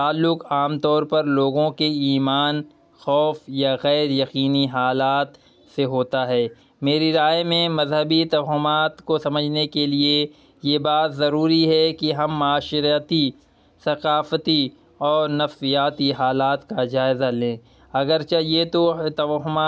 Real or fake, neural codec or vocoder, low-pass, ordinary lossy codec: real; none; none; none